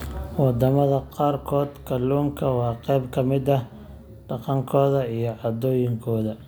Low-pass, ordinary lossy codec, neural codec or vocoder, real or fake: none; none; none; real